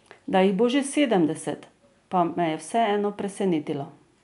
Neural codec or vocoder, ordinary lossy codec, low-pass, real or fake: none; none; 10.8 kHz; real